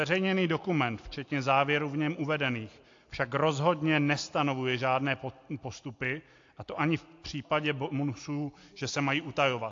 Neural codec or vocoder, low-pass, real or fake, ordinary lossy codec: none; 7.2 kHz; real; AAC, 48 kbps